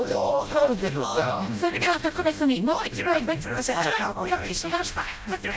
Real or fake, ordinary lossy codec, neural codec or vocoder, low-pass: fake; none; codec, 16 kHz, 0.5 kbps, FreqCodec, smaller model; none